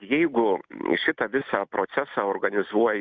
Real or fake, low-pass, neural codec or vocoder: real; 7.2 kHz; none